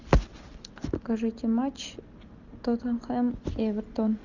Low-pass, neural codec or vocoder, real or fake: 7.2 kHz; none; real